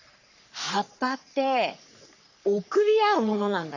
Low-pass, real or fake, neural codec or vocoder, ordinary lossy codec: 7.2 kHz; fake; codec, 44.1 kHz, 3.4 kbps, Pupu-Codec; none